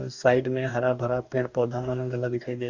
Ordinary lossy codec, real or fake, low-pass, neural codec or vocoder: Opus, 64 kbps; fake; 7.2 kHz; codec, 44.1 kHz, 2.6 kbps, DAC